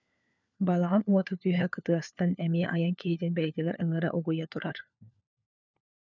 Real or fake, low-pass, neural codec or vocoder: fake; 7.2 kHz; codec, 16 kHz, 4 kbps, FunCodec, trained on LibriTTS, 50 frames a second